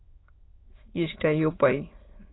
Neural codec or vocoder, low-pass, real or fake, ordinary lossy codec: autoencoder, 22.05 kHz, a latent of 192 numbers a frame, VITS, trained on many speakers; 7.2 kHz; fake; AAC, 16 kbps